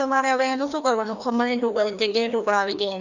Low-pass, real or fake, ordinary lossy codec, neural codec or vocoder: 7.2 kHz; fake; none; codec, 16 kHz, 1 kbps, FreqCodec, larger model